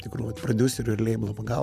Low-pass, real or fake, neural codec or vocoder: 14.4 kHz; fake; vocoder, 44.1 kHz, 128 mel bands every 512 samples, BigVGAN v2